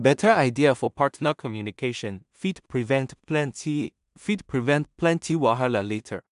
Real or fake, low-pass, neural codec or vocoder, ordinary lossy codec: fake; 10.8 kHz; codec, 16 kHz in and 24 kHz out, 0.4 kbps, LongCat-Audio-Codec, two codebook decoder; MP3, 96 kbps